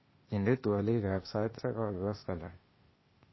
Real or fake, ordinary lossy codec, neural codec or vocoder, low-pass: fake; MP3, 24 kbps; codec, 16 kHz, 0.8 kbps, ZipCodec; 7.2 kHz